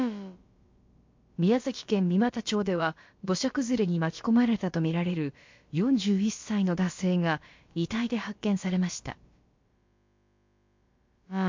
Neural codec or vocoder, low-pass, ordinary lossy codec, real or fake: codec, 16 kHz, about 1 kbps, DyCAST, with the encoder's durations; 7.2 kHz; MP3, 48 kbps; fake